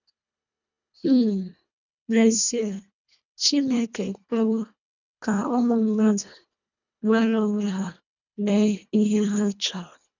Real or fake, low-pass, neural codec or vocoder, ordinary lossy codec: fake; 7.2 kHz; codec, 24 kHz, 1.5 kbps, HILCodec; none